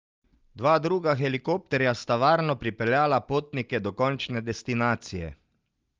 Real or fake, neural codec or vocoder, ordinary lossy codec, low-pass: real; none; Opus, 32 kbps; 7.2 kHz